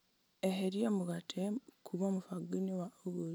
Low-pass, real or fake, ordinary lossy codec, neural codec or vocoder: none; real; none; none